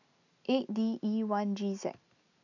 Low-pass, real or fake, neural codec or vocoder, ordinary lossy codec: 7.2 kHz; real; none; none